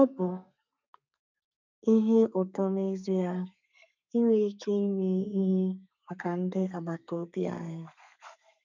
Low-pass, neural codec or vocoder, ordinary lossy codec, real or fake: 7.2 kHz; codec, 32 kHz, 1.9 kbps, SNAC; none; fake